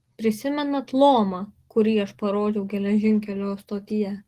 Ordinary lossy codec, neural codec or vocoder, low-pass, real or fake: Opus, 16 kbps; none; 14.4 kHz; real